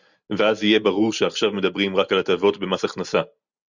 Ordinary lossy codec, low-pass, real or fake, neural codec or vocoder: Opus, 64 kbps; 7.2 kHz; real; none